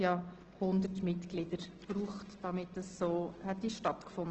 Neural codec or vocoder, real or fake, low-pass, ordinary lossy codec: none; real; 7.2 kHz; Opus, 16 kbps